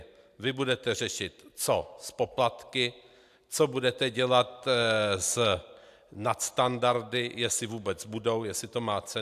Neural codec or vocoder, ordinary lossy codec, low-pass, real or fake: none; AAC, 96 kbps; 14.4 kHz; real